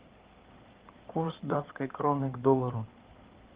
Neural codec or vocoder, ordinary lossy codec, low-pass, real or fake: codec, 16 kHz in and 24 kHz out, 2.2 kbps, FireRedTTS-2 codec; Opus, 16 kbps; 3.6 kHz; fake